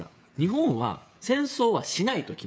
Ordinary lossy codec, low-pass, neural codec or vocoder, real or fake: none; none; codec, 16 kHz, 16 kbps, FreqCodec, smaller model; fake